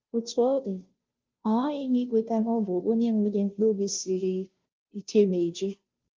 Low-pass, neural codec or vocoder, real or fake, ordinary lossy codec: 7.2 kHz; codec, 16 kHz, 0.5 kbps, FunCodec, trained on Chinese and English, 25 frames a second; fake; Opus, 32 kbps